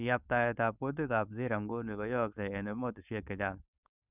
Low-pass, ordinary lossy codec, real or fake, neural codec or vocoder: 3.6 kHz; none; fake; codec, 16 kHz, 4.8 kbps, FACodec